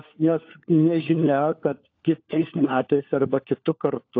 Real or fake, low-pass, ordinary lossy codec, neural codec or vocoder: fake; 7.2 kHz; AAC, 48 kbps; codec, 16 kHz, 4 kbps, FunCodec, trained on LibriTTS, 50 frames a second